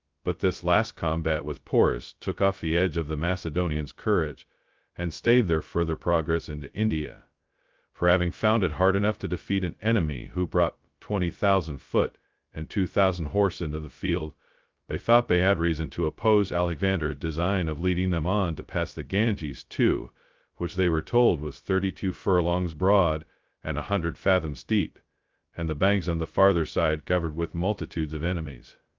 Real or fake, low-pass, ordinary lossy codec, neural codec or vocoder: fake; 7.2 kHz; Opus, 32 kbps; codec, 16 kHz, 0.2 kbps, FocalCodec